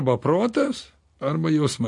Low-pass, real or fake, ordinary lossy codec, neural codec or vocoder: 10.8 kHz; real; MP3, 48 kbps; none